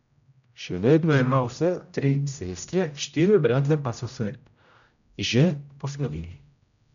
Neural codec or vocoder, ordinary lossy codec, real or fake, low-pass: codec, 16 kHz, 0.5 kbps, X-Codec, HuBERT features, trained on general audio; none; fake; 7.2 kHz